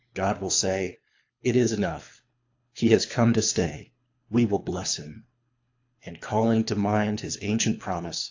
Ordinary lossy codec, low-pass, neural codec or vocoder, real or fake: AAC, 48 kbps; 7.2 kHz; codec, 24 kHz, 3 kbps, HILCodec; fake